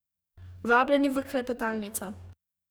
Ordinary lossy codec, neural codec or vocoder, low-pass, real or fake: none; codec, 44.1 kHz, 2.6 kbps, DAC; none; fake